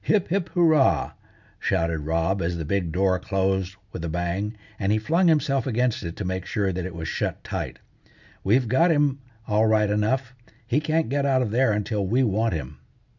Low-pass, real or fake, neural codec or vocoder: 7.2 kHz; real; none